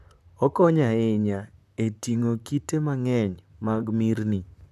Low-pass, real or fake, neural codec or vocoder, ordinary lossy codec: 14.4 kHz; fake; vocoder, 44.1 kHz, 128 mel bands, Pupu-Vocoder; none